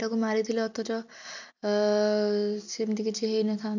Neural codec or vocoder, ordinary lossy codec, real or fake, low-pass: none; none; real; 7.2 kHz